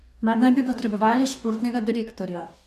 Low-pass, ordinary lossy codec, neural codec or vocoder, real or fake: 14.4 kHz; none; codec, 44.1 kHz, 2.6 kbps, DAC; fake